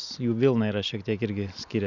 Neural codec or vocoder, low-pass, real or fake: none; 7.2 kHz; real